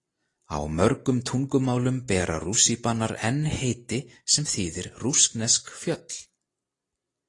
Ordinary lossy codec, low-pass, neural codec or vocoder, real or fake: AAC, 32 kbps; 10.8 kHz; none; real